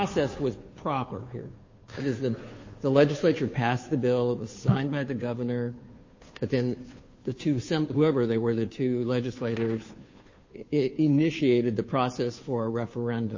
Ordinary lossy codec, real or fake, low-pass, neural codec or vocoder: MP3, 32 kbps; fake; 7.2 kHz; codec, 16 kHz, 2 kbps, FunCodec, trained on Chinese and English, 25 frames a second